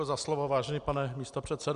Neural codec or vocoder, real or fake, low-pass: none; real; 10.8 kHz